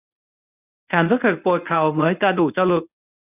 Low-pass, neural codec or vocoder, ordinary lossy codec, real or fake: 3.6 kHz; codec, 24 kHz, 0.9 kbps, WavTokenizer, medium speech release version 1; none; fake